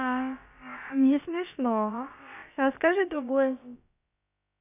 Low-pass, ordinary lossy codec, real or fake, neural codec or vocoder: 3.6 kHz; none; fake; codec, 16 kHz, about 1 kbps, DyCAST, with the encoder's durations